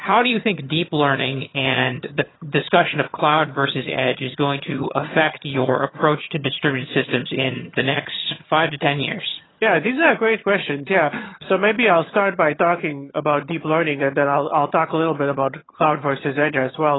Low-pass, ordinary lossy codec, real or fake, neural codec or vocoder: 7.2 kHz; AAC, 16 kbps; fake; vocoder, 22.05 kHz, 80 mel bands, HiFi-GAN